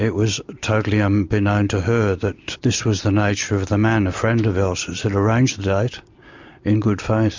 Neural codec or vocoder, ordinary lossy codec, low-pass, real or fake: none; MP3, 64 kbps; 7.2 kHz; real